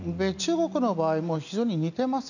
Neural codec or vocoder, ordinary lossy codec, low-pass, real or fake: none; none; 7.2 kHz; real